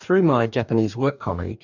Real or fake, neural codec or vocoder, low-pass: fake; codec, 44.1 kHz, 2.6 kbps, DAC; 7.2 kHz